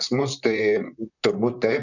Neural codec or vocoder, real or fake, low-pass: vocoder, 44.1 kHz, 128 mel bands, Pupu-Vocoder; fake; 7.2 kHz